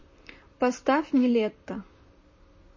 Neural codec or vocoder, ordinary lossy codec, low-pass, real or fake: vocoder, 44.1 kHz, 128 mel bands, Pupu-Vocoder; MP3, 32 kbps; 7.2 kHz; fake